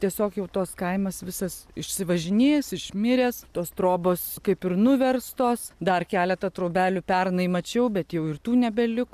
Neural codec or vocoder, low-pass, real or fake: none; 14.4 kHz; real